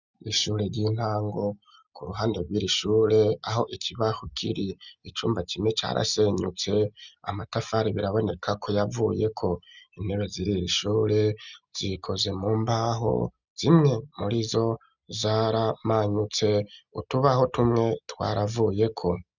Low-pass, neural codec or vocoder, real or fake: 7.2 kHz; none; real